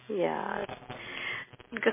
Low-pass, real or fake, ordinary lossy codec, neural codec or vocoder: 3.6 kHz; real; MP3, 16 kbps; none